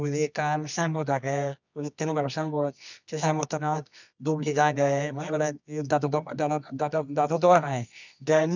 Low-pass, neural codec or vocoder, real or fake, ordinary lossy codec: 7.2 kHz; codec, 24 kHz, 0.9 kbps, WavTokenizer, medium music audio release; fake; none